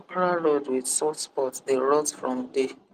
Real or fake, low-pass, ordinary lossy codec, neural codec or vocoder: real; 14.4 kHz; Opus, 32 kbps; none